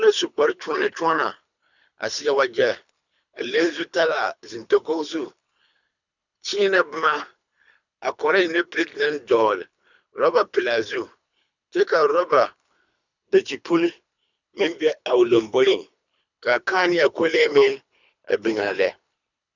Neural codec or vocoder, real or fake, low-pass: codec, 24 kHz, 3 kbps, HILCodec; fake; 7.2 kHz